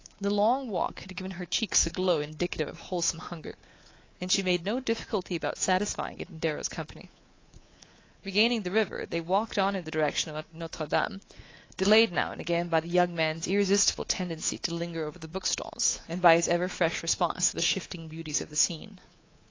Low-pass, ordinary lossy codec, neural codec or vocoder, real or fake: 7.2 kHz; AAC, 32 kbps; codec, 24 kHz, 3.1 kbps, DualCodec; fake